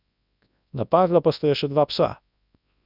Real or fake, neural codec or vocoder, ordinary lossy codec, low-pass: fake; codec, 24 kHz, 0.9 kbps, WavTokenizer, large speech release; none; 5.4 kHz